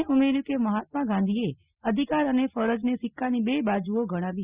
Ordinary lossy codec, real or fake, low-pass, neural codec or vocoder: Opus, 64 kbps; real; 3.6 kHz; none